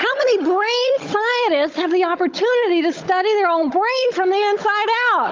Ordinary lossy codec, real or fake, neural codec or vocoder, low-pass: Opus, 24 kbps; fake; codec, 16 kHz, 16 kbps, FunCodec, trained on Chinese and English, 50 frames a second; 7.2 kHz